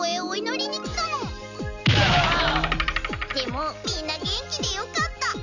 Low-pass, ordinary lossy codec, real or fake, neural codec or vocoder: 7.2 kHz; none; real; none